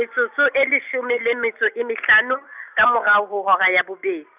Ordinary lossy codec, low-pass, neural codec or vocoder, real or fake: none; 3.6 kHz; none; real